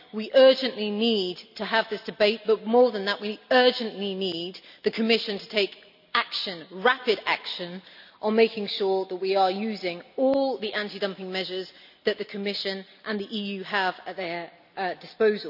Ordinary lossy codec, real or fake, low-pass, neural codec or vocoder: none; real; 5.4 kHz; none